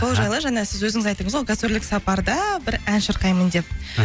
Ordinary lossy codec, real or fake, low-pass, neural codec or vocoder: none; real; none; none